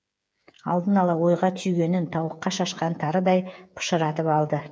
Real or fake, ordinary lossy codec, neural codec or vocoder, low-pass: fake; none; codec, 16 kHz, 8 kbps, FreqCodec, smaller model; none